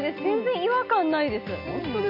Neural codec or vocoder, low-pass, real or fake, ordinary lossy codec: none; 5.4 kHz; real; none